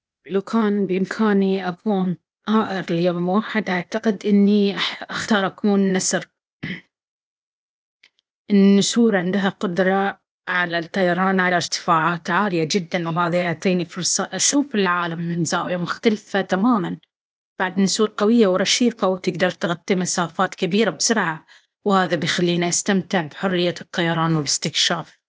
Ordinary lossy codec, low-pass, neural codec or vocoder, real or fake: none; none; codec, 16 kHz, 0.8 kbps, ZipCodec; fake